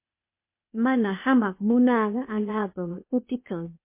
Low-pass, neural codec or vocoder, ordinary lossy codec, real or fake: 3.6 kHz; codec, 16 kHz, 0.8 kbps, ZipCodec; MP3, 24 kbps; fake